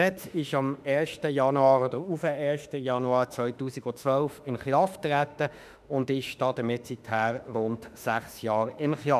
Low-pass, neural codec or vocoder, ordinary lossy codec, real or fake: 14.4 kHz; autoencoder, 48 kHz, 32 numbers a frame, DAC-VAE, trained on Japanese speech; none; fake